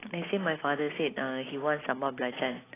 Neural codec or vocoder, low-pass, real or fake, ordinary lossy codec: none; 3.6 kHz; real; AAC, 16 kbps